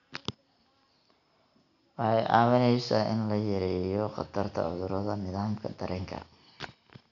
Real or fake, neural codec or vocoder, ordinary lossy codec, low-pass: real; none; none; 7.2 kHz